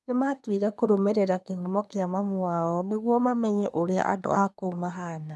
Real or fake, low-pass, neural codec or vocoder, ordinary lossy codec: fake; none; codec, 24 kHz, 1 kbps, SNAC; none